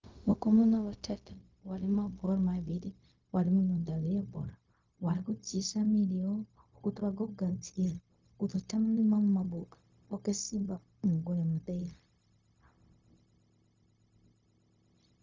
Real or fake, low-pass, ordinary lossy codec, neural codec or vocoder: fake; 7.2 kHz; Opus, 32 kbps; codec, 16 kHz, 0.4 kbps, LongCat-Audio-Codec